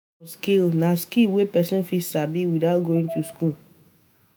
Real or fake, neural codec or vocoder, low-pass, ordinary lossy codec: fake; autoencoder, 48 kHz, 128 numbers a frame, DAC-VAE, trained on Japanese speech; none; none